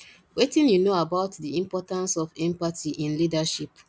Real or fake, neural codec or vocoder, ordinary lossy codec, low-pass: real; none; none; none